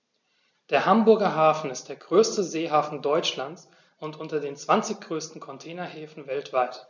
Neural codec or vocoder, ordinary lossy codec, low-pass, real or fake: none; none; 7.2 kHz; real